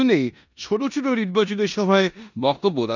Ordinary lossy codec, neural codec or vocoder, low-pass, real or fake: none; codec, 16 kHz in and 24 kHz out, 0.9 kbps, LongCat-Audio-Codec, four codebook decoder; 7.2 kHz; fake